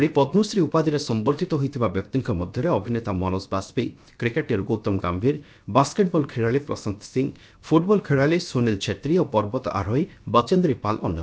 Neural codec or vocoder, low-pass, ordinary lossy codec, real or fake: codec, 16 kHz, 0.7 kbps, FocalCodec; none; none; fake